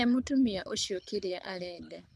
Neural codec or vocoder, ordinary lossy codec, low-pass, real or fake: codec, 24 kHz, 6 kbps, HILCodec; none; none; fake